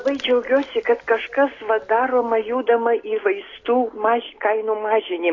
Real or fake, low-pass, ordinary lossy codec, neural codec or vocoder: real; 7.2 kHz; AAC, 32 kbps; none